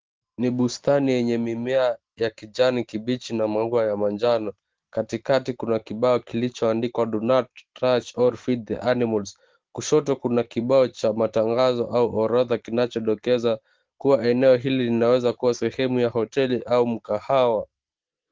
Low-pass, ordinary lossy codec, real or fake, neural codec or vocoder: 7.2 kHz; Opus, 16 kbps; real; none